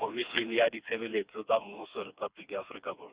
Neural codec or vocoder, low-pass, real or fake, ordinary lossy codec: codec, 16 kHz, 2 kbps, FreqCodec, smaller model; 3.6 kHz; fake; Opus, 64 kbps